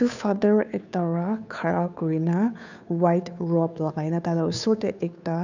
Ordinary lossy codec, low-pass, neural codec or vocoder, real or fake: none; 7.2 kHz; codec, 16 kHz, 2 kbps, FunCodec, trained on Chinese and English, 25 frames a second; fake